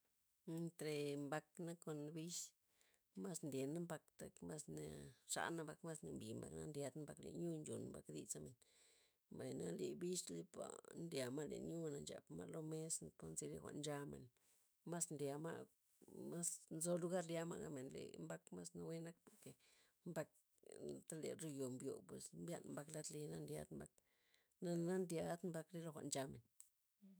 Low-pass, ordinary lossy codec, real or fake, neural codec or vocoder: none; none; fake; autoencoder, 48 kHz, 128 numbers a frame, DAC-VAE, trained on Japanese speech